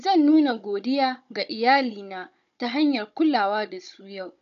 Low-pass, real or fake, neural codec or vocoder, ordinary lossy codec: 7.2 kHz; fake; codec, 16 kHz, 16 kbps, FunCodec, trained on Chinese and English, 50 frames a second; none